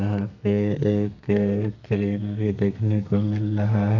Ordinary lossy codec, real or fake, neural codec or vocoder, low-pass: none; fake; codec, 32 kHz, 1.9 kbps, SNAC; 7.2 kHz